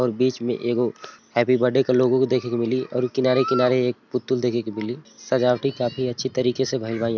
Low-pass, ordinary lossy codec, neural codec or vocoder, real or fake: 7.2 kHz; none; none; real